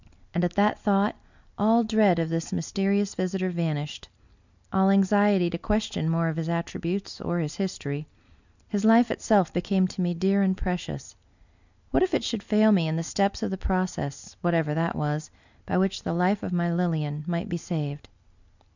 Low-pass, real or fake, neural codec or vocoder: 7.2 kHz; real; none